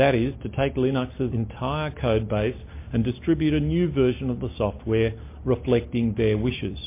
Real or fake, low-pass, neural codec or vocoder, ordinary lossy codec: real; 3.6 kHz; none; MP3, 24 kbps